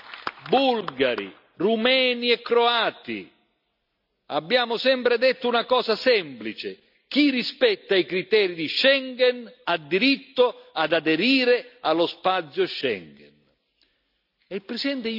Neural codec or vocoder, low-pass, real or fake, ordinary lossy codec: none; 5.4 kHz; real; none